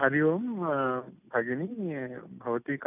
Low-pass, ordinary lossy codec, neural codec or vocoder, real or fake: 3.6 kHz; none; none; real